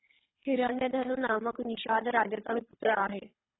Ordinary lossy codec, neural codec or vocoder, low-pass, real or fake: AAC, 16 kbps; codec, 24 kHz, 3 kbps, HILCodec; 7.2 kHz; fake